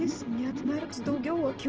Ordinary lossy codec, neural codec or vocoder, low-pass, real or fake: Opus, 16 kbps; none; 7.2 kHz; real